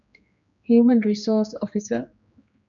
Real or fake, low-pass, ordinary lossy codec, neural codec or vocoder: fake; 7.2 kHz; MP3, 96 kbps; codec, 16 kHz, 2 kbps, X-Codec, HuBERT features, trained on general audio